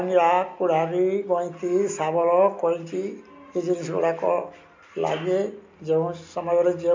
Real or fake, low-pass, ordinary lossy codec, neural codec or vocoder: real; 7.2 kHz; MP3, 48 kbps; none